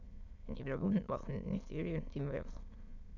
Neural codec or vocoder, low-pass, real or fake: autoencoder, 22.05 kHz, a latent of 192 numbers a frame, VITS, trained on many speakers; 7.2 kHz; fake